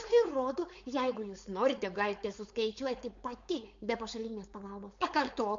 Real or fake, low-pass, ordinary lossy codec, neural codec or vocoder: fake; 7.2 kHz; MP3, 48 kbps; codec, 16 kHz, 4.8 kbps, FACodec